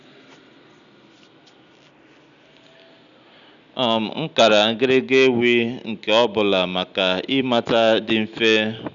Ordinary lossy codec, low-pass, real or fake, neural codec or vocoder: none; 7.2 kHz; real; none